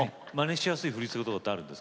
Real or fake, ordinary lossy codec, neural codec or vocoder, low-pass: real; none; none; none